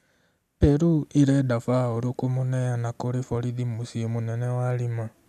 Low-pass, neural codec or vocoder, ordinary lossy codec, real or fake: 14.4 kHz; none; none; real